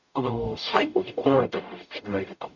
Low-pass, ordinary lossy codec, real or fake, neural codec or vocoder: 7.2 kHz; none; fake; codec, 44.1 kHz, 0.9 kbps, DAC